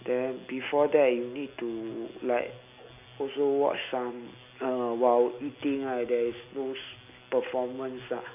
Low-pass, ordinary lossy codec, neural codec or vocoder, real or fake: 3.6 kHz; none; none; real